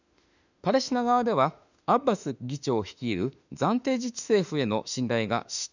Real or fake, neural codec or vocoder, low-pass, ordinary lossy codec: fake; autoencoder, 48 kHz, 32 numbers a frame, DAC-VAE, trained on Japanese speech; 7.2 kHz; none